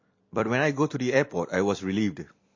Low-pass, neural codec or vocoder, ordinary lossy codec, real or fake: 7.2 kHz; vocoder, 44.1 kHz, 128 mel bands every 256 samples, BigVGAN v2; MP3, 32 kbps; fake